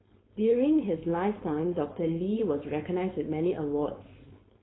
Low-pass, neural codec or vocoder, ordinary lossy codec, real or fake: 7.2 kHz; codec, 16 kHz, 4.8 kbps, FACodec; AAC, 16 kbps; fake